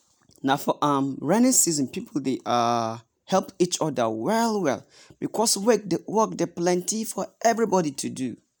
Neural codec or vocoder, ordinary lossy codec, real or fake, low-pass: none; none; real; none